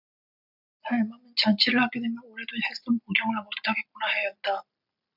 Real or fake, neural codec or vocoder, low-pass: real; none; 5.4 kHz